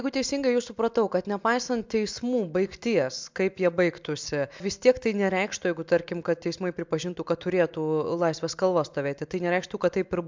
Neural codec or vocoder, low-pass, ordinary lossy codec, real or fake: none; 7.2 kHz; MP3, 64 kbps; real